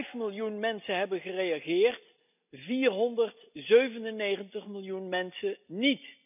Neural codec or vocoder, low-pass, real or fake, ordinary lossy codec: none; 3.6 kHz; real; none